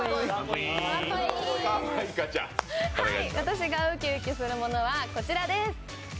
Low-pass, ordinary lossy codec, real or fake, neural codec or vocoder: none; none; real; none